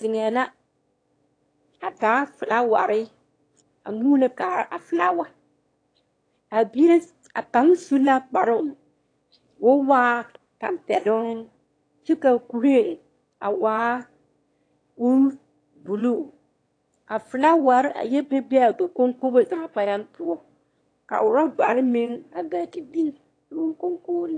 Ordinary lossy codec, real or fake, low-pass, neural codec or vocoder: AAC, 48 kbps; fake; 9.9 kHz; autoencoder, 22.05 kHz, a latent of 192 numbers a frame, VITS, trained on one speaker